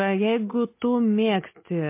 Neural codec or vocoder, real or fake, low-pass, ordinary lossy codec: none; real; 3.6 kHz; MP3, 24 kbps